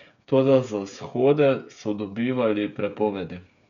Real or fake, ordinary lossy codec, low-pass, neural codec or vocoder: fake; none; 7.2 kHz; codec, 16 kHz, 8 kbps, FreqCodec, smaller model